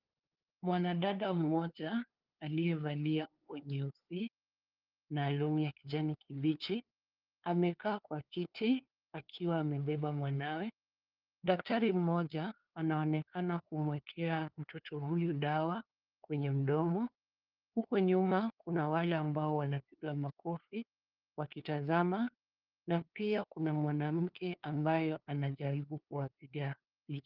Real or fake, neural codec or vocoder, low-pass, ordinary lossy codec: fake; codec, 16 kHz, 2 kbps, FunCodec, trained on LibriTTS, 25 frames a second; 5.4 kHz; Opus, 32 kbps